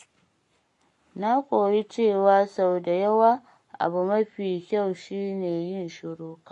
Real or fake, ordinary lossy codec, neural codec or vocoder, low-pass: fake; MP3, 48 kbps; codec, 44.1 kHz, 7.8 kbps, Pupu-Codec; 14.4 kHz